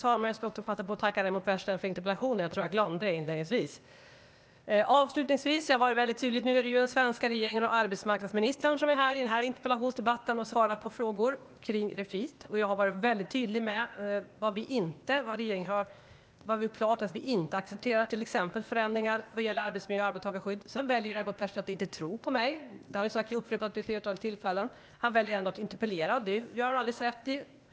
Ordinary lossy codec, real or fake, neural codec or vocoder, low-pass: none; fake; codec, 16 kHz, 0.8 kbps, ZipCodec; none